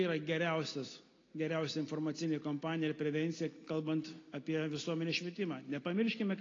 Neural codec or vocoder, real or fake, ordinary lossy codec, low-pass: none; real; AAC, 32 kbps; 7.2 kHz